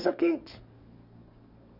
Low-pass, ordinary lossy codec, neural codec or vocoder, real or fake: 5.4 kHz; Opus, 64 kbps; codec, 44.1 kHz, 7.8 kbps, DAC; fake